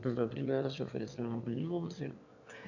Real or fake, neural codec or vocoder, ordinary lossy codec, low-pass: fake; autoencoder, 22.05 kHz, a latent of 192 numbers a frame, VITS, trained on one speaker; none; 7.2 kHz